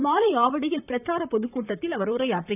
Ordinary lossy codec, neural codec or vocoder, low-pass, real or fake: none; vocoder, 44.1 kHz, 128 mel bands, Pupu-Vocoder; 3.6 kHz; fake